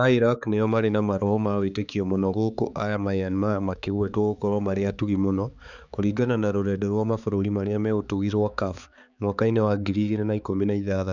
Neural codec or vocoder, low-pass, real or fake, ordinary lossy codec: codec, 16 kHz, 4 kbps, X-Codec, HuBERT features, trained on balanced general audio; 7.2 kHz; fake; none